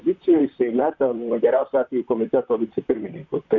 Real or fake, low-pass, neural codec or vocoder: fake; 7.2 kHz; vocoder, 44.1 kHz, 128 mel bands, Pupu-Vocoder